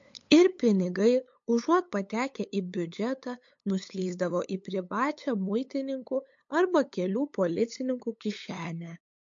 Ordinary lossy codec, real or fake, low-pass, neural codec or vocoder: MP3, 48 kbps; fake; 7.2 kHz; codec, 16 kHz, 8 kbps, FunCodec, trained on LibriTTS, 25 frames a second